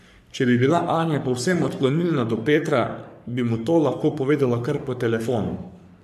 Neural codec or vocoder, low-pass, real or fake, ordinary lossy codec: codec, 44.1 kHz, 3.4 kbps, Pupu-Codec; 14.4 kHz; fake; none